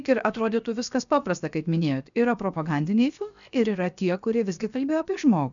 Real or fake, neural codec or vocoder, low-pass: fake; codec, 16 kHz, 0.7 kbps, FocalCodec; 7.2 kHz